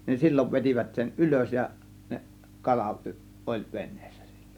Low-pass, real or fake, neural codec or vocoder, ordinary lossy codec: 19.8 kHz; real; none; none